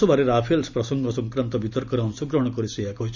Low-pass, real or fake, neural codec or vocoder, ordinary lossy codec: 7.2 kHz; real; none; none